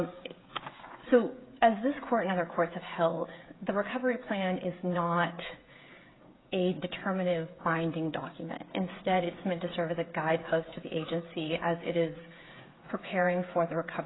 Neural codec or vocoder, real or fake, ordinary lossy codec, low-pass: codec, 16 kHz, 16 kbps, FreqCodec, smaller model; fake; AAC, 16 kbps; 7.2 kHz